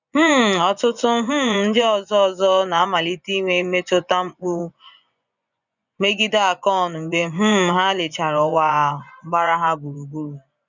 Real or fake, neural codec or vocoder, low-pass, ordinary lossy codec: fake; vocoder, 24 kHz, 100 mel bands, Vocos; 7.2 kHz; none